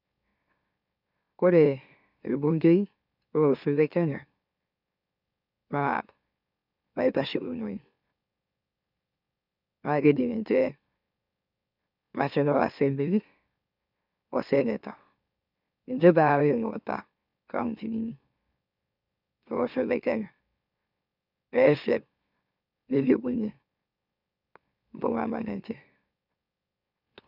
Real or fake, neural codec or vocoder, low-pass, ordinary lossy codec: fake; autoencoder, 44.1 kHz, a latent of 192 numbers a frame, MeloTTS; 5.4 kHz; none